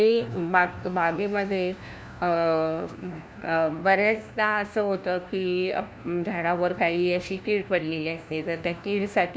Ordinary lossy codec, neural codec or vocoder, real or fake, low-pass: none; codec, 16 kHz, 1 kbps, FunCodec, trained on LibriTTS, 50 frames a second; fake; none